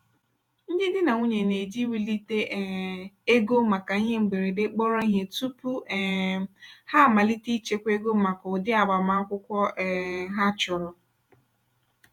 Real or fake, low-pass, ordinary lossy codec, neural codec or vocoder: fake; 19.8 kHz; none; vocoder, 48 kHz, 128 mel bands, Vocos